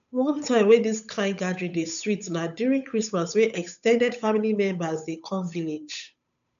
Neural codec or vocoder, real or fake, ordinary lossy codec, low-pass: codec, 16 kHz, 8 kbps, FunCodec, trained on Chinese and English, 25 frames a second; fake; none; 7.2 kHz